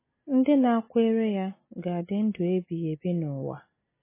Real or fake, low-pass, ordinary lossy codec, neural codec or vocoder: real; 3.6 kHz; MP3, 16 kbps; none